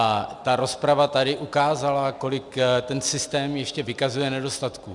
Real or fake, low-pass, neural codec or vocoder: real; 10.8 kHz; none